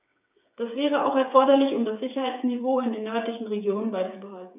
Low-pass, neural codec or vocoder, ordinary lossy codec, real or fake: 3.6 kHz; codec, 16 kHz, 8 kbps, FreqCodec, smaller model; none; fake